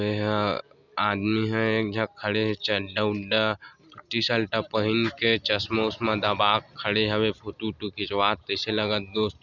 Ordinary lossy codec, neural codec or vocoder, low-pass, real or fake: none; none; 7.2 kHz; real